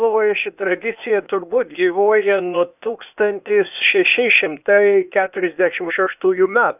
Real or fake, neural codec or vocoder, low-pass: fake; codec, 16 kHz, 0.8 kbps, ZipCodec; 3.6 kHz